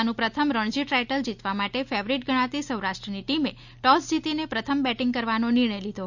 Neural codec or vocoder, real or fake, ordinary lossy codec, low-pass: none; real; none; 7.2 kHz